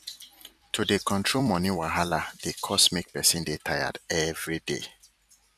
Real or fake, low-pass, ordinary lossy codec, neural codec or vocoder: fake; 14.4 kHz; none; vocoder, 44.1 kHz, 128 mel bands every 256 samples, BigVGAN v2